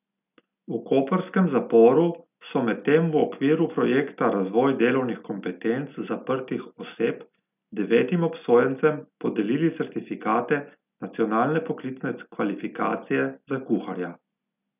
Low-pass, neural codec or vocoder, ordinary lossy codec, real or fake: 3.6 kHz; none; none; real